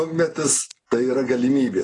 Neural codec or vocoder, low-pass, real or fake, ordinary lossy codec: none; 10.8 kHz; real; AAC, 32 kbps